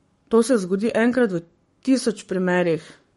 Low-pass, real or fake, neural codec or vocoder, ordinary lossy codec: 19.8 kHz; fake; codec, 44.1 kHz, 7.8 kbps, Pupu-Codec; MP3, 48 kbps